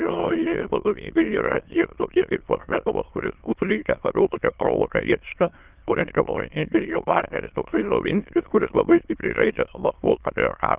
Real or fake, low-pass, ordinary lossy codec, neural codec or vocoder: fake; 3.6 kHz; Opus, 32 kbps; autoencoder, 22.05 kHz, a latent of 192 numbers a frame, VITS, trained on many speakers